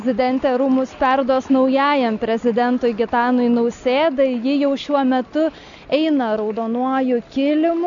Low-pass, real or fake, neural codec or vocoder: 7.2 kHz; real; none